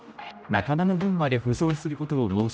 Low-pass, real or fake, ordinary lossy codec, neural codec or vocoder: none; fake; none; codec, 16 kHz, 0.5 kbps, X-Codec, HuBERT features, trained on general audio